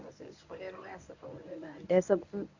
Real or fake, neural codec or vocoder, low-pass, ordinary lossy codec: fake; codec, 24 kHz, 0.9 kbps, WavTokenizer, medium speech release version 1; 7.2 kHz; none